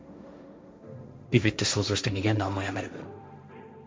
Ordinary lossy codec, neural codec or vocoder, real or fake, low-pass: none; codec, 16 kHz, 1.1 kbps, Voila-Tokenizer; fake; none